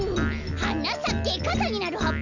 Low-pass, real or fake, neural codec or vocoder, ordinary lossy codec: 7.2 kHz; real; none; none